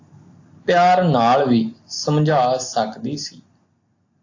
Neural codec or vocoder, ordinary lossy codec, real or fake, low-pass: none; AAC, 48 kbps; real; 7.2 kHz